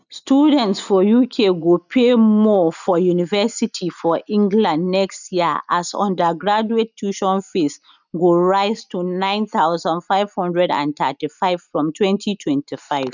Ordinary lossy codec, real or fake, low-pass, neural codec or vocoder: none; real; 7.2 kHz; none